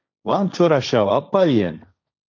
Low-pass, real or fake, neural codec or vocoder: 7.2 kHz; fake; codec, 16 kHz, 1.1 kbps, Voila-Tokenizer